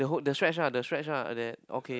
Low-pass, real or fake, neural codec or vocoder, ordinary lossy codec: none; real; none; none